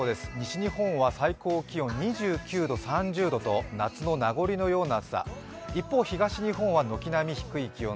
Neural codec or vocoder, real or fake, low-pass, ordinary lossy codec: none; real; none; none